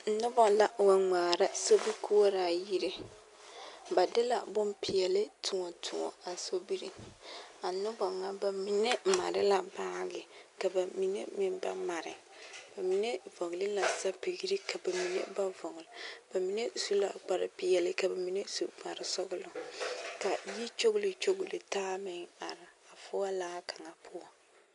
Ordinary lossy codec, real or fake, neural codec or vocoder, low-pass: MP3, 64 kbps; real; none; 10.8 kHz